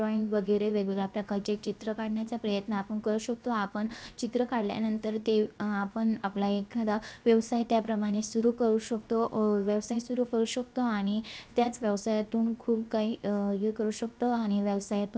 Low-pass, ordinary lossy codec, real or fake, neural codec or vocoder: none; none; fake; codec, 16 kHz, 0.7 kbps, FocalCodec